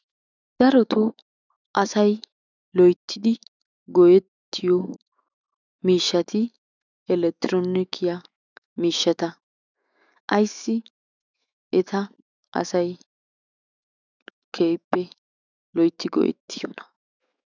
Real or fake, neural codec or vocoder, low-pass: fake; autoencoder, 48 kHz, 128 numbers a frame, DAC-VAE, trained on Japanese speech; 7.2 kHz